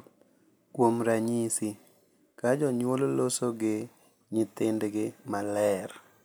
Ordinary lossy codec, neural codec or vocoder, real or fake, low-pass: none; none; real; none